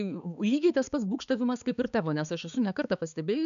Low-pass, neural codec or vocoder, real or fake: 7.2 kHz; codec, 16 kHz, 4 kbps, X-Codec, HuBERT features, trained on balanced general audio; fake